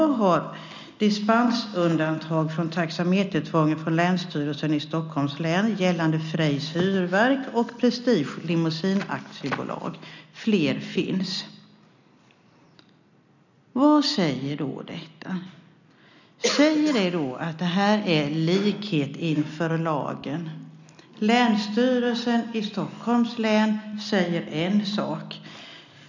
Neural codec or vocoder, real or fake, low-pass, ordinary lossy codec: none; real; 7.2 kHz; none